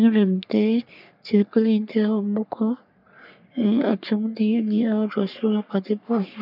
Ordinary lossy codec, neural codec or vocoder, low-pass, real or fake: none; codec, 44.1 kHz, 3.4 kbps, Pupu-Codec; 5.4 kHz; fake